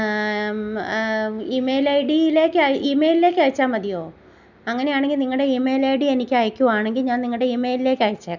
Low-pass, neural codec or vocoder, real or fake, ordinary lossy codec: 7.2 kHz; none; real; none